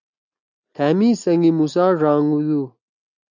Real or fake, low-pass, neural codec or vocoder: real; 7.2 kHz; none